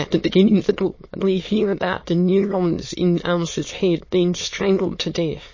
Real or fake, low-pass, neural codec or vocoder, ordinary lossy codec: fake; 7.2 kHz; autoencoder, 22.05 kHz, a latent of 192 numbers a frame, VITS, trained on many speakers; MP3, 32 kbps